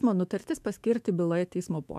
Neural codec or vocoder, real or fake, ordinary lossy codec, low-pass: autoencoder, 48 kHz, 128 numbers a frame, DAC-VAE, trained on Japanese speech; fake; MP3, 96 kbps; 14.4 kHz